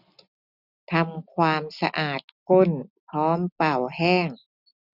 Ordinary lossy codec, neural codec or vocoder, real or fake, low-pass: none; none; real; 5.4 kHz